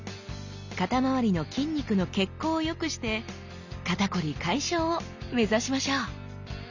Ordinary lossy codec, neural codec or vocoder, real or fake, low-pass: none; none; real; 7.2 kHz